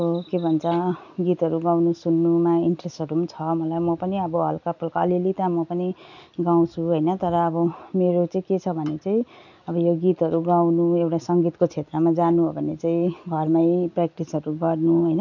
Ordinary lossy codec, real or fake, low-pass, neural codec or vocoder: none; real; 7.2 kHz; none